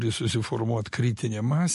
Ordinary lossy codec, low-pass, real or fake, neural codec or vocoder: MP3, 48 kbps; 14.4 kHz; real; none